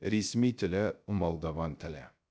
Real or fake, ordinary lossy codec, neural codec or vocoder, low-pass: fake; none; codec, 16 kHz, 0.3 kbps, FocalCodec; none